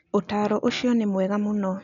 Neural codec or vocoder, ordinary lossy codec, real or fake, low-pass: none; none; real; 7.2 kHz